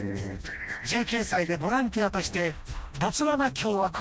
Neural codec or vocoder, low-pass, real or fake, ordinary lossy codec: codec, 16 kHz, 1 kbps, FreqCodec, smaller model; none; fake; none